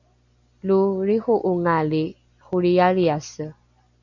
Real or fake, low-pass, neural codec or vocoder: real; 7.2 kHz; none